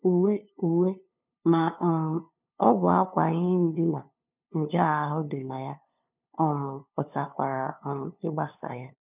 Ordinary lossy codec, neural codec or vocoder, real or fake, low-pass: none; codec, 16 kHz, 2 kbps, FunCodec, trained on LibriTTS, 25 frames a second; fake; 3.6 kHz